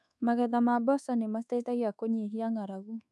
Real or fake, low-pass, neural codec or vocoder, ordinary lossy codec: fake; none; codec, 24 kHz, 1.2 kbps, DualCodec; none